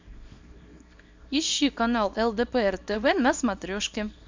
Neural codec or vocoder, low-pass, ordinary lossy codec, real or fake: codec, 24 kHz, 0.9 kbps, WavTokenizer, small release; 7.2 kHz; MP3, 64 kbps; fake